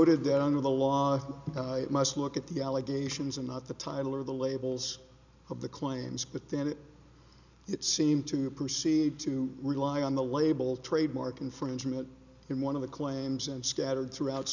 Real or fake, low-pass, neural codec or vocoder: real; 7.2 kHz; none